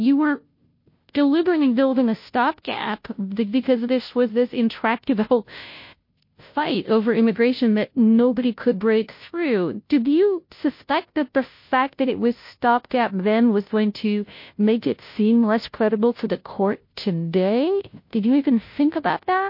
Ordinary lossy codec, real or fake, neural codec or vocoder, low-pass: MP3, 32 kbps; fake; codec, 16 kHz, 0.5 kbps, FunCodec, trained on Chinese and English, 25 frames a second; 5.4 kHz